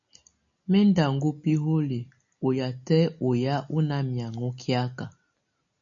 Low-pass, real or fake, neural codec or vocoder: 7.2 kHz; real; none